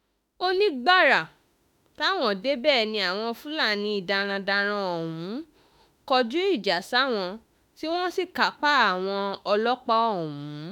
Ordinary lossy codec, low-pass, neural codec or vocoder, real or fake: none; 19.8 kHz; autoencoder, 48 kHz, 32 numbers a frame, DAC-VAE, trained on Japanese speech; fake